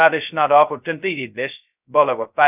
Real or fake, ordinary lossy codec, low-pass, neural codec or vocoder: fake; none; 3.6 kHz; codec, 16 kHz, 0.2 kbps, FocalCodec